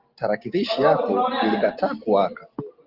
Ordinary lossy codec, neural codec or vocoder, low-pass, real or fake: Opus, 32 kbps; none; 5.4 kHz; real